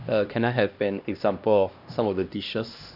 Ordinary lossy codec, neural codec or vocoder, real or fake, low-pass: none; codec, 16 kHz, 1 kbps, X-Codec, HuBERT features, trained on LibriSpeech; fake; 5.4 kHz